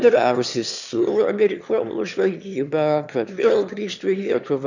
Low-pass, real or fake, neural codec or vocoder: 7.2 kHz; fake; autoencoder, 22.05 kHz, a latent of 192 numbers a frame, VITS, trained on one speaker